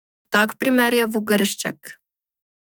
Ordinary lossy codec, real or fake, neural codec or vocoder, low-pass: none; fake; codec, 44.1 kHz, 2.6 kbps, SNAC; none